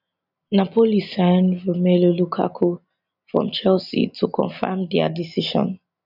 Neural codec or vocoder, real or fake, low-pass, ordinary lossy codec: none; real; 5.4 kHz; none